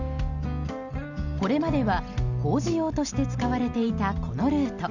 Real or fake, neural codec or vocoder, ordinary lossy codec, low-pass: real; none; none; 7.2 kHz